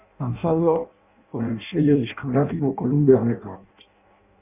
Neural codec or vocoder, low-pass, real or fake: codec, 16 kHz in and 24 kHz out, 0.6 kbps, FireRedTTS-2 codec; 3.6 kHz; fake